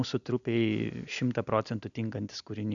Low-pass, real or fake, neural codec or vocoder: 7.2 kHz; real; none